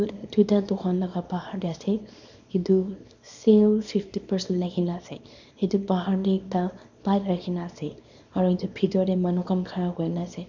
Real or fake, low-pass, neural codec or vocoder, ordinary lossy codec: fake; 7.2 kHz; codec, 24 kHz, 0.9 kbps, WavTokenizer, small release; none